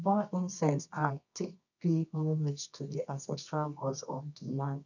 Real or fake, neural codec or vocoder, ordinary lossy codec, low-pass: fake; codec, 24 kHz, 0.9 kbps, WavTokenizer, medium music audio release; none; 7.2 kHz